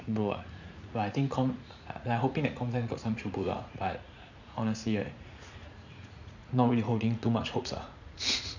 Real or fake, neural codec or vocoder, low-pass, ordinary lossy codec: fake; vocoder, 44.1 kHz, 80 mel bands, Vocos; 7.2 kHz; none